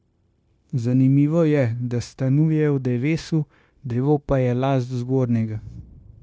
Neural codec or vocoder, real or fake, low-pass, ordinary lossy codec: codec, 16 kHz, 0.9 kbps, LongCat-Audio-Codec; fake; none; none